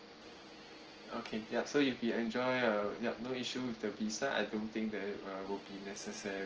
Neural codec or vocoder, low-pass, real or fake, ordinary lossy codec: none; 7.2 kHz; real; Opus, 16 kbps